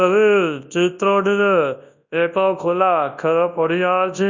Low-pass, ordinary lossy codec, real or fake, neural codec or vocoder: 7.2 kHz; Opus, 64 kbps; fake; codec, 24 kHz, 0.9 kbps, WavTokenizer, large speech release